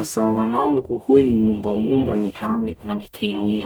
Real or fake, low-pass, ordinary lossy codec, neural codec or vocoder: fake; none; none; codec, 44.1 kHz, 0.9 kbps, DAC